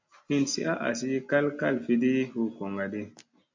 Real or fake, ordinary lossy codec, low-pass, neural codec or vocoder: real; MP3, 64 kbps; 7.2 kHz; none